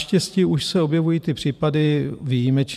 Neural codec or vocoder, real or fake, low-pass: vocoder, 44.1 kHz, 128 mel bands every 512 samples, BigVGAN v2; fake; 14.4 kHz